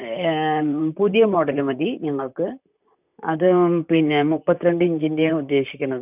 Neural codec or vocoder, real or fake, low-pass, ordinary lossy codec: vocoder, 44.1 kHz, 128 mel bands, Pupu-Vocoder; fake; 3.6 kHz; none